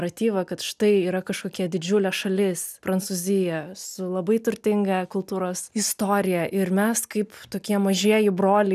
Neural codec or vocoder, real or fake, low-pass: none; real; 14.4 kHz